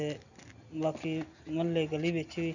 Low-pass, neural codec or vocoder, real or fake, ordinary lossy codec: 7.2 kHz; none; real; none